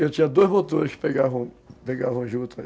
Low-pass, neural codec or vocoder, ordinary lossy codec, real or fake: none; none; none; real